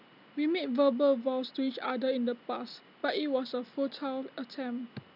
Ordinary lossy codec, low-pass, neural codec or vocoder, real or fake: none; 5.4 kHz; none; real